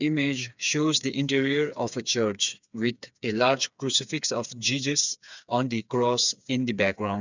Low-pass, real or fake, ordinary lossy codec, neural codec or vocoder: 7.2 kHz; fake; none; codec, 16 kHz, 4 kbps, FreqCodec, smaller model